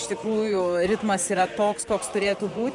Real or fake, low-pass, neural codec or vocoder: fake; 10.8 kHz; vocoder, 44.1 kHz, 128 mel bands, Pupu-Vocoder